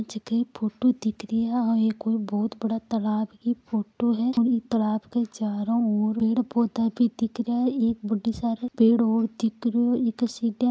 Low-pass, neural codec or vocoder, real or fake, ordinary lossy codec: none; none; real; none